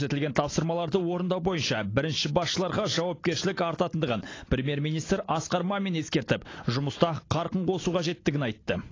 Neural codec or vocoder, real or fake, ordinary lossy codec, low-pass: none; real; AAC, 32 kbps; 7.2 kHz